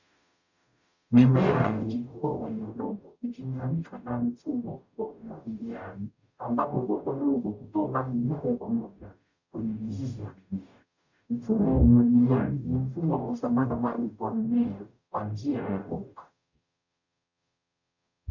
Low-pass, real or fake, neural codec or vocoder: 7.2 kHz; fake; codec, 44.1 kHz, 0.9 kbps, DAC